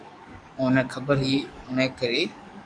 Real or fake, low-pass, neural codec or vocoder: fake; 9.9 kHz; codec, 44.1 kHz, 7.8 kbps, DAC